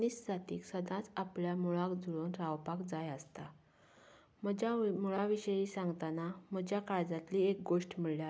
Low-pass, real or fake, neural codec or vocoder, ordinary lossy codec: none; real; none; none